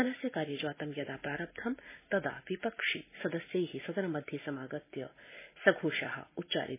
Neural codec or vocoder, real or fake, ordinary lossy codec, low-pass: none; real; MP3, 16 kbps; 3.6 kHz